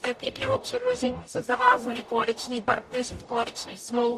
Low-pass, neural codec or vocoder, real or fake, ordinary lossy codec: 14.4 kHz; codec, 44.1 kHz, 0.9 kbps, DAC; fake; AAC, 64 kbps